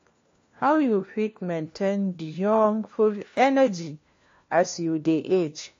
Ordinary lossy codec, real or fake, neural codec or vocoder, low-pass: AAC, 48 kbps; fake; codec, 16 kHz, 1 kbps, FunCodec, trained on LibriTTS, 50 frames a second; 7.2 kHz